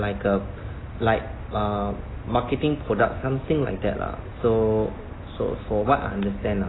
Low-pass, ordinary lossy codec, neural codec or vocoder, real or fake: 7.2 kHz; AAC, 16 kbps; none; real